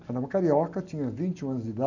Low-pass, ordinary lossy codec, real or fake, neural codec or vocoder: 7.2 kHz; none; real; none